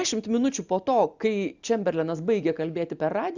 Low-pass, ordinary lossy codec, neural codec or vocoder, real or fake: 7.2 kHz; Opus, 64 kbps; none; real